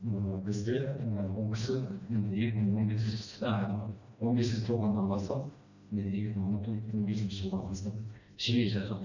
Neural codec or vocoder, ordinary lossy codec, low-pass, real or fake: codec, 16 kHz, 1 kbps, FreqCodec, smaller model; none; 7.2 kHz; fake